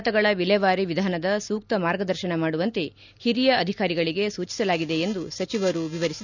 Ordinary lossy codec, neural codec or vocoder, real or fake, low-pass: none; none; real; 7.2 kHz